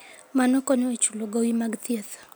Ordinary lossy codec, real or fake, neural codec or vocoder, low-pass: none; real; none; none